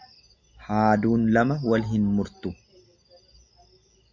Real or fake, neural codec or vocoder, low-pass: real; none; 7.2 kHz